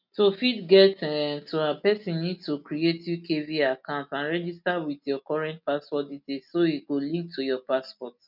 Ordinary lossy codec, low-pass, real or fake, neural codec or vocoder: none; 5.4 kHz; real; none